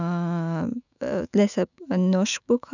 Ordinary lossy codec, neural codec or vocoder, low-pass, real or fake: none; autoencoder, 48 kHz, 128 numbers a frame, DAC-VAE, trained on Japanese speech; 7.2 kHz; fake